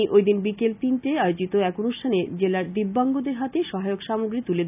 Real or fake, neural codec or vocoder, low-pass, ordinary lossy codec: real; none; 3.6 kHz; none